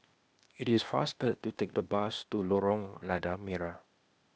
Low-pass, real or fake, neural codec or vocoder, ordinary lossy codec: none; fake; codec, 16 kHz, 0.8 kbps, ZipCodec; none